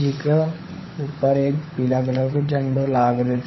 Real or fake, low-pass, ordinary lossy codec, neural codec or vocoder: fake; 7.2 kHz; MP3, 24 kbps; codec, 16 kHz, 16 kbps, FunCodec, trained on LibriTTS, 50 frames a second